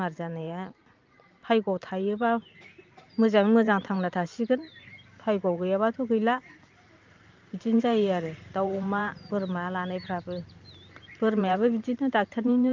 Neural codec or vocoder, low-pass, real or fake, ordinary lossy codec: vocoder, 44.1 kHz, 128 mel bands every 512 samples, BigVGAN v2; 7.2 kHz; fake; Opus, 24 kbps